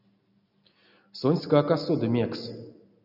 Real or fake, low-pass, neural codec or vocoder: real; 5.4 kHz; none